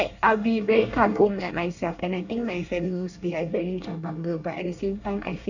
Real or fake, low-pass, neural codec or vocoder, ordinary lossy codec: fake; 7.2 kHz; codec, 24 kHz, 1 kbps, SNAC; none